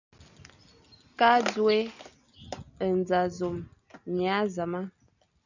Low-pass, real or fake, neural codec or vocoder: 7.2 kHz; real; none